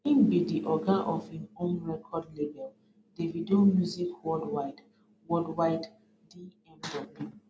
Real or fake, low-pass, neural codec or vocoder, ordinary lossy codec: real; none; none; none